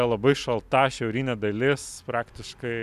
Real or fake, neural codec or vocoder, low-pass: real; none; 14.4 kHz